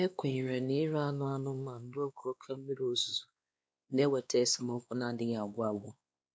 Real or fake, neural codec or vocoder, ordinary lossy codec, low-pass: fake; codec, 16 kHz, 2 kbps, X-Codec, WavLM features, trained on Multilingual LibriSpeech; none; none